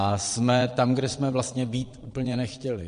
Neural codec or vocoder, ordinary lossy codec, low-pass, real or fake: vocoder, 22.05 kHz, 80 mel bands, Vocos; MP3, 48 kbps; 9.9 kHz; fake